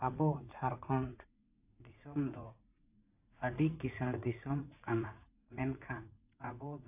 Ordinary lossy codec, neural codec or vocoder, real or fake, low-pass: AAC, 32 kbps; vocoder, 44.1 kHz, 80 mel bands, Vocos; fake; 3.6 kHz